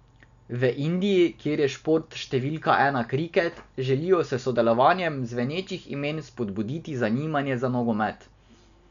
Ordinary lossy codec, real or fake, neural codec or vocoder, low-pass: none; real; none; 7.2 kHz